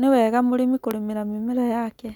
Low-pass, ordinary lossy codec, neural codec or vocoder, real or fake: 19.8 kHz; none; none; real